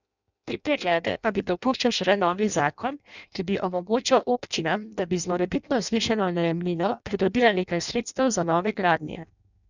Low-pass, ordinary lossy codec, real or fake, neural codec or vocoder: 7.2 kHz; none; fake; codec, 16 kHz in and 24 kHz out, 0.6 kbps, FireRedTTS-2 codec